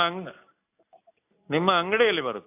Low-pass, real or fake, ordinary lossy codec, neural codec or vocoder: 3.6 kHz; real; AAC, 32 kbps; none